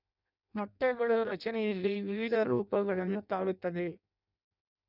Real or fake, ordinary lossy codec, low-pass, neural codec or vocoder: fake; none; 5.4 kHz; codec, 16 kHz in and 24 kHz out, 0.6 kbps, FireRedTTS-2 codec